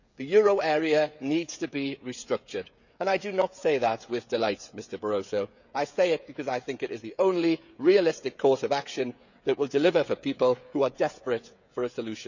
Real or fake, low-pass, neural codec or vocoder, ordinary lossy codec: fake; 7.2 kHz; codec, 16 kHz, 16 kbps, FreqCodec, smaller model; none